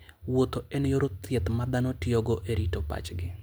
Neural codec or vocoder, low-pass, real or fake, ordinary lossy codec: none; none; real; none